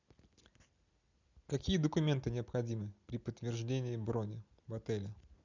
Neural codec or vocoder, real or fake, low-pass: none; real; 7.2 kHz